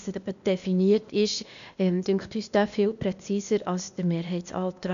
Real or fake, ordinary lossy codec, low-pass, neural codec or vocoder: fake; none; 7.2 kHz; codec, 16 kHz, 0.8 kbps, ZipCodec